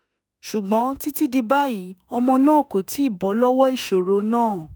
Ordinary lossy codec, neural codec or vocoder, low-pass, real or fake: none; autoencoder, 48 kHz, 32 numbers a frame, DAC-VAE, trained on Japanese speech; none; fake